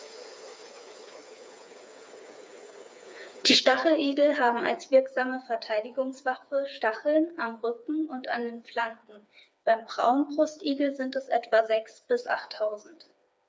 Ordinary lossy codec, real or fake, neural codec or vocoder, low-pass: none; fake; codec, 16 kHz, 4 kbps, FreqCodec, smaller model; none